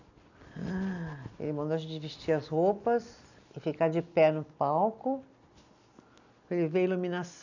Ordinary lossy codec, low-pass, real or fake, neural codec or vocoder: none; 7.2 kHz; real; none